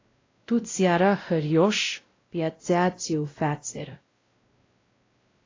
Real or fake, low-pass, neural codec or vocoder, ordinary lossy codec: fake; 7.2 kHz; codec, 16 kHz, 0.5 kbps, X-Codec, WavLM features, trained on Multilingual LibriSpeech; AAC, 32 kbps